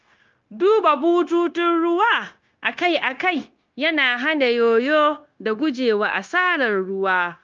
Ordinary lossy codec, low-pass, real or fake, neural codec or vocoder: Opus, 24 kbps; 7.2 kHz; fake; codec, 16 kHz, 0.9 kbps, LongCat-Audio-Codec